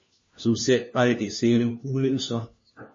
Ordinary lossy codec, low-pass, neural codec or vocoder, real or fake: MP3, 32 kbps; 7.2 kHz; codec, 16 kHz, 1 kbps, FunCodec, trained on LibriTTS, 50 frames a second; fake